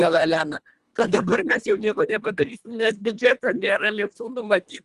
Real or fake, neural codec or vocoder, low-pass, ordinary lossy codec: fake; codec, 24 kHz, 1.5 kbps, HILCodec; 10.8 kHz; Opus, 32 kbps